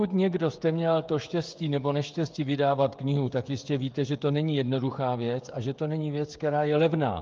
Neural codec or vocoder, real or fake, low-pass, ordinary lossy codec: codec, 16 kHz, 16 kbps, FreqCodec, smaller model; fake; 7.2 kHz; Opus, 24 kbps